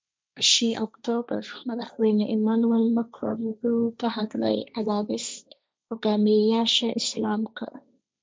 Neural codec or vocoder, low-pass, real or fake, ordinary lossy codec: codec, 16 kHz, 1.1 kbps, Voila-Tokenizer; none; fake; none